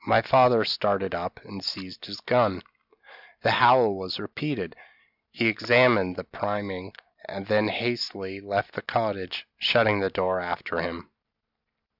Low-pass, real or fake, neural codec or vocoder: 5.4 kHz; real; none